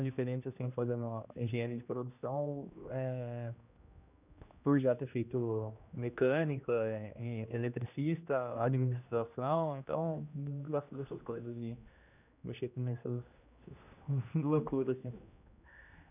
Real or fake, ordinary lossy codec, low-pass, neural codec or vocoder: fake; none; 3.6 kHz; codec, 16 kHz, 1 kbps, X-Codec, HuBERT features, trained on general audio